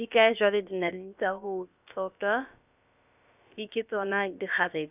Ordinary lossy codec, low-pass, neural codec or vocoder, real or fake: none; 3.6 kHz; codec, 16 kHz, about 1 kbps, DyCAST, with the encoder's durations; fake